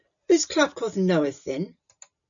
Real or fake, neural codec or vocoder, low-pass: real; none; 7.2 kHz